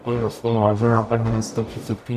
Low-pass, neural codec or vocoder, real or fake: 14.4 kHz; codec, 44.1 kHz, 0.9 kbps, DAC; fake